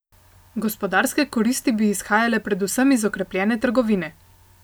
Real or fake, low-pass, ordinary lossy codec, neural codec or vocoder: real; none; none; none